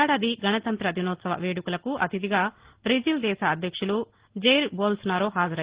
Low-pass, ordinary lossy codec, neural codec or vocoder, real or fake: 3.6 kHz; Opus, 16 kbps; none; real